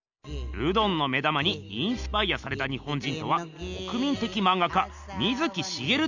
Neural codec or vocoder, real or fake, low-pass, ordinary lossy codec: none; real; 7.2 kHz; none